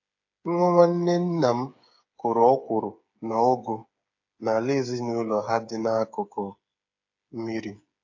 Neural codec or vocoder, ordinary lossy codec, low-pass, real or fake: codec, 16 kHz, 8 kbps, FreqCodec, smaller model; AAC, 48 kbps; 7.2 kHz; fake